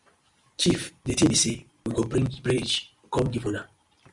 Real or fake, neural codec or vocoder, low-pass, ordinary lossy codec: real; none; 10.8 kHz; Opus, 64 kbps